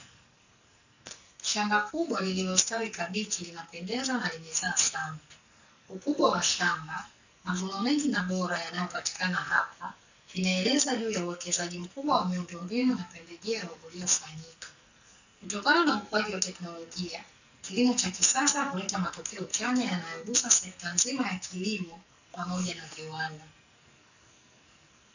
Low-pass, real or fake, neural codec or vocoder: 7.2 kHz; fake; codec, 44.1 kHz, 2.6 kbps, SNAC